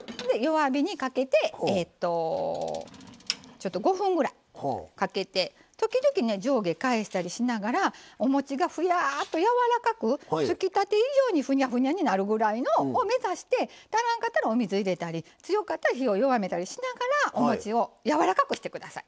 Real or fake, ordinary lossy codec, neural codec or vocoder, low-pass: real; none; none; none